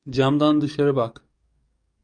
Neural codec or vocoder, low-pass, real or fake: codec, 44.1 kHz, 7.8 kbps, DAC; 9.9 kHz; fake